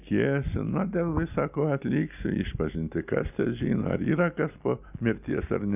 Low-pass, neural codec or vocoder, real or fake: 3.6 kHz; none; real